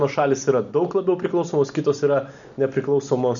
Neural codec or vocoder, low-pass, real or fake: none; 7.2 kHz; real